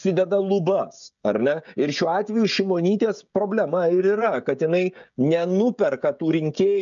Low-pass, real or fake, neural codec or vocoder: 7.2 kHz; fake; codec, 16 kHz, 16 kbps, FreqCodec, smaller model